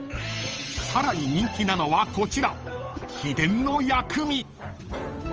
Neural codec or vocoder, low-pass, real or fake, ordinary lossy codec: codec, 16 kHz, 8 kbps, FunCodec, trained on Chinese and English, 25 frames a second; 7.2 kHz; fake; Opus, 24 kbps